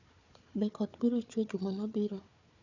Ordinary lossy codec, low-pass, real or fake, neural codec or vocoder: none; 7.2 kHz; fake; codec, 16 kHz, 4 kbps, FunCodec, trained on Chinese and English, 50 frames a second